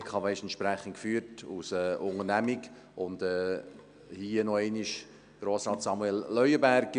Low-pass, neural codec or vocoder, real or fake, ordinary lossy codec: 9.9 kHz; none; real; MP3, 96 kbps